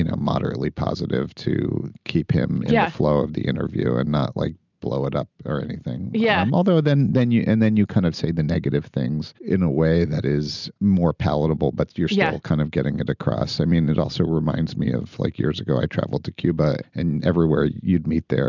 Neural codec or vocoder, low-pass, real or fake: none; 7.2 kHz; real